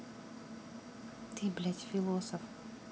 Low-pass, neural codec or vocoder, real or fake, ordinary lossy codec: none; none; real; none